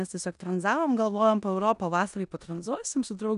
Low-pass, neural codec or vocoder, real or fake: 10.8 kHz; codec, 16 kHz in and 24 kHz out, 0.9 kbps, LongCat-Audio-Codec, four codebook decoder; fake